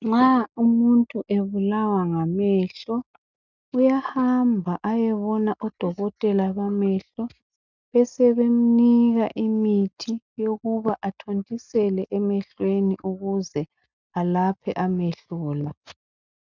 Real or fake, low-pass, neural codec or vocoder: real; 7.2 kHz; none